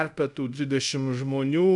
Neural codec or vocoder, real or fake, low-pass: codec, 24 kHz, 0.5 kbps, DualCodec; fake; 10.8 kHz